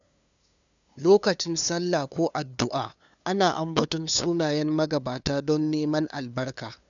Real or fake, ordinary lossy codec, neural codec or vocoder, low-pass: fake; none; codec, 16 kHz, 2 kbps, FunCodec, trained on LibriTTS, 25 frames a second; 7.2 kHz